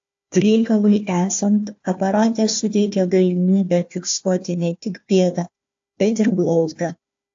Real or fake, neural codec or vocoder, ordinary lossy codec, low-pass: fake; codec, 16 kHz, 1 kbps, FunCodec, trained on Chinese and English, 50 frames a second; AAC, 48 kbps; 7.2 kHz